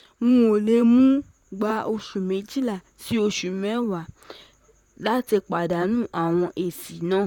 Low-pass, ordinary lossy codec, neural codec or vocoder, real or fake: 19.8 kHz; none; vocoder, 44.1 kHz, 128 mel bands, Pupu-Vocoder; fake